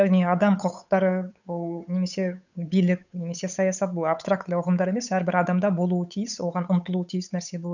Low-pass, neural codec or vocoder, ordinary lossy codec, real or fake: 7.2 kHz; codec, 16 kHz, 8 kbps, FunCodec, trained on Chinese and English, 25 frames a second; none; fake